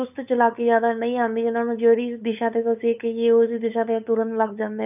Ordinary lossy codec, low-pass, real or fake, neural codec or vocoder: none; 3.6 kHz; fake; codec, 16 kHz, 8 kbps, FunCodec, trained on LibriTTS, 25 frames a second